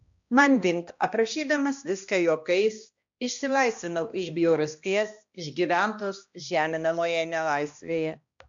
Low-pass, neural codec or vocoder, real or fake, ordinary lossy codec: 7.2 kHz; codec, 16 kHz, 1 kbps, X-Codec, HuBERT features, trained on balanced general audio; fake; AAC, 64 kbps